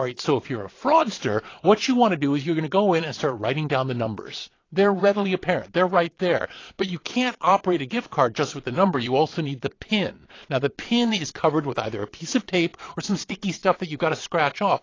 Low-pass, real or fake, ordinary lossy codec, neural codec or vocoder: 7.2 kHz; fake; AAC, 32 kbps; vocoder, 22.05 kHz, 80 mel bands, Vocos